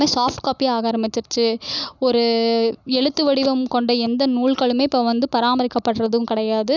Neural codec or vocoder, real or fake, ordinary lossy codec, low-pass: none; real; none; 7.2 kHz